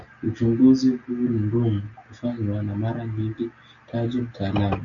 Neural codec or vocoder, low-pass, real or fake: none; 7.2 kHz; real